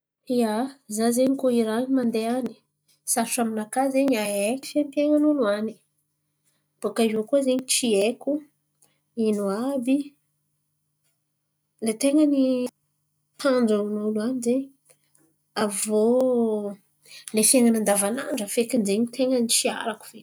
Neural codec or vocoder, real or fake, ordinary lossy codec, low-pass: none; real; none; none